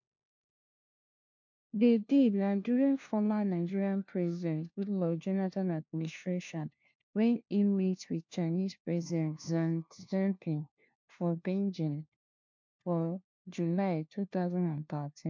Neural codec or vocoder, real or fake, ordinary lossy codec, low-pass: codec, 16 kHz, 1 kbps, FunCodec, trained on LibriTTS, 50 frames a second; fake; MP3, 48 kbps; 7.2 kHz